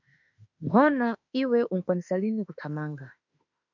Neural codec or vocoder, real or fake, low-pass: autoencoder, 48 kHz, 32 numbers a frame, DAC-VAE, trained on Japanese speech; fake; 7.2 kHz